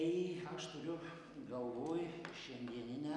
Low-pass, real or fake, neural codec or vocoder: 10.8 kHz; real; none